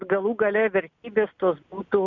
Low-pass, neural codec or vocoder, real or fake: 7.2 kHz; none; real